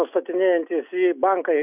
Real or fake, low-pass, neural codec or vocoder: real; 3.6 kHz; none